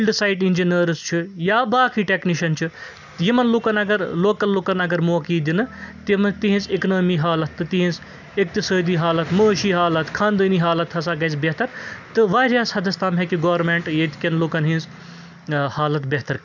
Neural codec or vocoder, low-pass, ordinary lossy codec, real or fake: none; 7.2 kHz; none; real